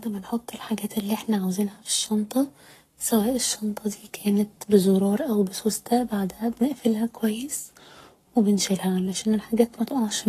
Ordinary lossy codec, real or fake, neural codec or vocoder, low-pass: AAC, 48 kbps; fake; codec, 44.1 kHz, 7.8 kbps, Pupu-Codec; 14.4 kHz